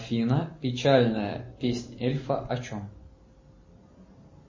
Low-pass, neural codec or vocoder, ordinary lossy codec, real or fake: 7.2 kHz; none; MP3, 32 kbps; real